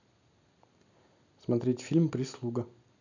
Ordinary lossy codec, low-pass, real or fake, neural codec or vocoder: none; 7.2 kHz; real; none